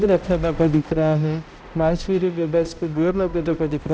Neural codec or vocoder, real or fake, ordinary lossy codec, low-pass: codec, 16 kHz, 0.5 kbps, X-Codec, HuBERT features, trained on balanced general audio; fake; none; none